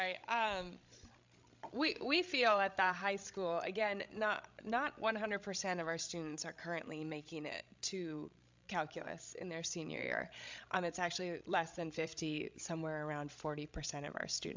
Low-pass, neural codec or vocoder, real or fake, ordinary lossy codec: 7.2 kHz; codec, 16 kHz, 16 kbps, FreqCodec, larger model; fake; MP3, 64 kbps